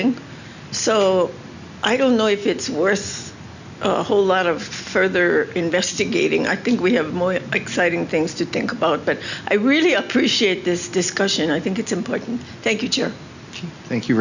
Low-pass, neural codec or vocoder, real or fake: 7.2 kHz; none; real